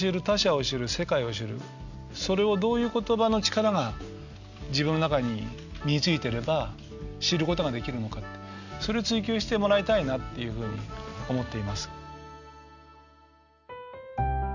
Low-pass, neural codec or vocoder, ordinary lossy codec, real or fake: 7.2 kHz; none; none; real